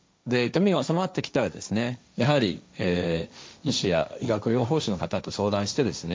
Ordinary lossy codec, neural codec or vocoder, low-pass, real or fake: none; codec, 16 kHz, 1.1 kbps, Voila-Tokenizer; none; fake